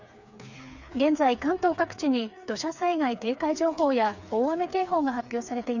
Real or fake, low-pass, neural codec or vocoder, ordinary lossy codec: fake; 7.2 kHz; codec, 16 kHz, 4 kbps, FreqCodec, smaller model; none